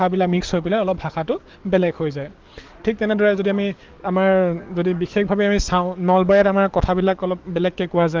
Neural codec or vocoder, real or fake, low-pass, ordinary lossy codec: codec, 44.1 kHz, 7.8 kbps, DAC; fake; 7.2 kHz; Opus, 32 kbps